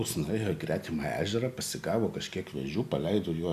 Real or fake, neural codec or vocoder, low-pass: real; none; 14.4 kHz